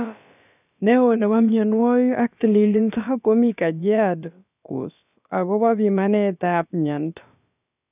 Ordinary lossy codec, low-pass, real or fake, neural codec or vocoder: none; 3.6 kHz; fake; codec, 16 kHz, about 1 kbps, DyCAST, with the encoder's durations